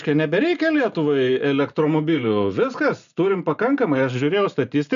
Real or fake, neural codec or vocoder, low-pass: real; none; 7.2 kHz